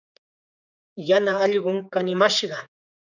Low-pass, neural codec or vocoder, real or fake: 7.2 kHz; codec, 16 kHz, 4 kbps, X-Codec, HuBERT features, trained on general audio; fake